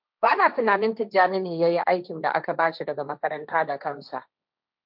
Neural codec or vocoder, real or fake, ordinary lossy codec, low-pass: codec, 16 kHz, 1.1 kbps, Voila-Tokenizer; fake; MP3, 48 kbps; 5.4 kHz